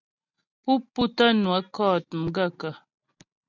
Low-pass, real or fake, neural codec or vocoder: 7.2 kHz; real; none